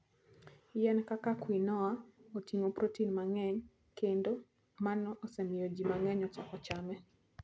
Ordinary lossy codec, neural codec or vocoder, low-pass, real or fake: none; none; none; real